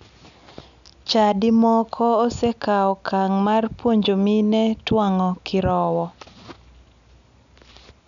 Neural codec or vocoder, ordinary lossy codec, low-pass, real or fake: none; none; 7.2 kHz; real